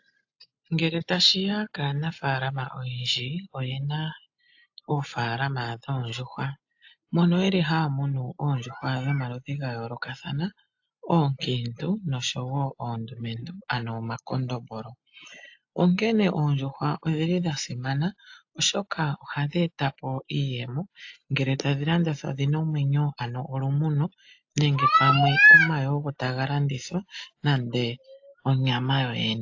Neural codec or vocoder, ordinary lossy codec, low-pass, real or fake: none; AAC, 48 kbps; 7.2 kHz; real